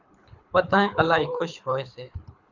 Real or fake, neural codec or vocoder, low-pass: fake; codec, 24 kHz, 6 kbps, HILCodec; 7.2 kHz